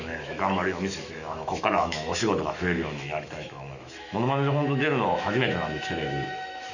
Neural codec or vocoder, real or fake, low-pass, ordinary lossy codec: autoencoder, 48 kHz, 128 numbers a frame, DAC-VAE, trained on Japanese speech; fake; 7.2 kHz; none